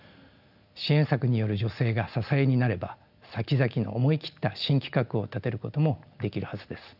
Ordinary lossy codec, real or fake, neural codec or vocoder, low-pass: none; real; none; 5.4 kHz